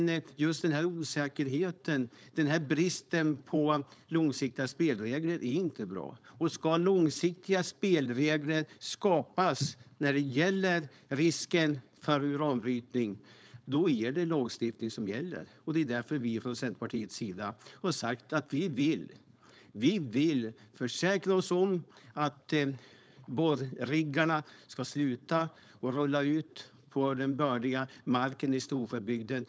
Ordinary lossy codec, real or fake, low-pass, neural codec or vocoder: none; fake; none; codec, 16 kHz, 4.8 kbps, FACodec